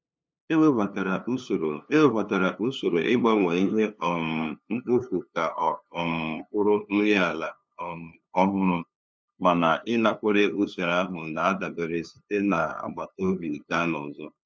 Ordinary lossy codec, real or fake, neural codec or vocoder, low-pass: none; fake; codec, 16 kHz, 2 kbps, FunCodec, trained on LibriTTS, 25 frames a second; none